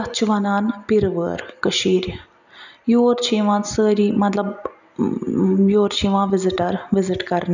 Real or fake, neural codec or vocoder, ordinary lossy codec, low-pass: real; none; none; 7.2 kHz